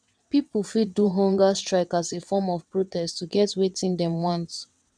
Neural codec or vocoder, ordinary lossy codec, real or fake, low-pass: vocoder, 22.05 kHz, 80 mel bands, WaveNeXt; none; fake; 9.9 kHz